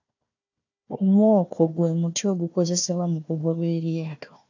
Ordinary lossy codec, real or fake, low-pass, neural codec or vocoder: AAC, 48 kbps; fake; 7.2 kHz; codec, 16 kHz, 1 kbps, FunCodec, trained on Chinese and English, 50 frames a second